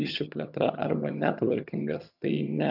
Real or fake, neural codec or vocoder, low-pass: fake; vocoder, 22.05 kHz, 80 mel bands, HiFi-GAN; 5.4 kHz